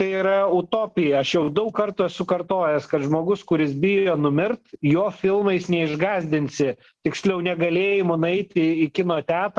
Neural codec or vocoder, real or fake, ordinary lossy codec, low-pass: none; real; Opus, 16 kbps; 10.8 kHz